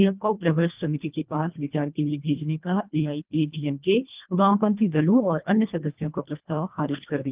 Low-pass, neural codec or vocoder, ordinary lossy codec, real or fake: 3.6 kHz; codec, 24 kHz, 1.5 kbps, HILCodec; Opus, 24 kbps; fake